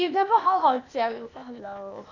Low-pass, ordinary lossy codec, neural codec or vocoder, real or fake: 7.2 kHz; none; codec, 16 kHz, 0.8 kbps, ZipCodec; fake